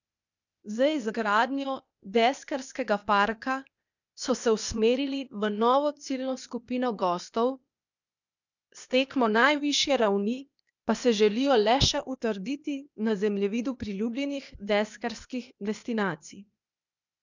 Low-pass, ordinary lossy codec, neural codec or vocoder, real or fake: 7.2 kHz; none; codec, 16 kHz, 0.8 kbps, ZipCodec; fake